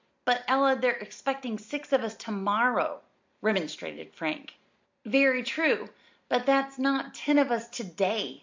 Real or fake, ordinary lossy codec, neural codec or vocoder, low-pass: real; MP3, 48 kbps; none; 7.2 kHz